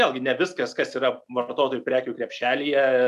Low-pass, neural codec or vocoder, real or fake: 14.4 kHz; none; real